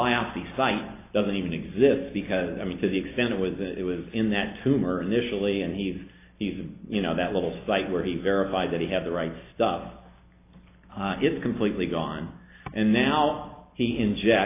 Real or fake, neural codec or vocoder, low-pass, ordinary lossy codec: fake; vocoder, 44.1 kHz, 128 mel bands every 256 samples, BigVGAN v2; 3.6 kHz; AAC, 24 kbps